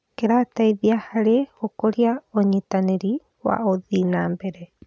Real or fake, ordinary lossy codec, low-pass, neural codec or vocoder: real; none; none; none